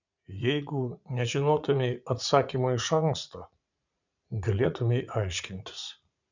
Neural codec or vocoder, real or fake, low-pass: vocoder, 44.1 kHz, 80 mel bands, Vocos; fake; 7.2 kHz